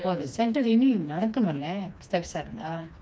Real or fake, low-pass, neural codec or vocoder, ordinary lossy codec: fake; none; codec, 16 kHz, 2 kbps, FreqCodec, smaller model; none